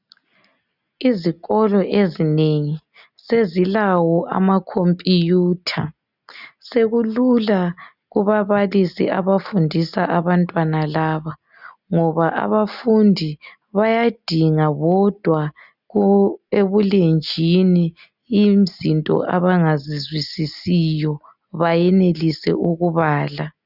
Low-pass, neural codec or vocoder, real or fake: 5.4 kHz; none; real